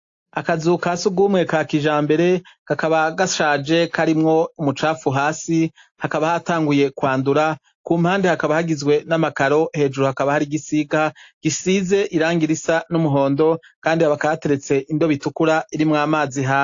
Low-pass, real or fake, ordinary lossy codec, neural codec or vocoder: 7.2 kHz; real; AAC, 48 kbps; none